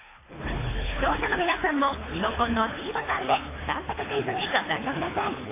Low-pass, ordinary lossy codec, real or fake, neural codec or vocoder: 3.6 kHz; AAC, 16 kbps; fake; codec, 24 kHz, 3 kbps, HILCodec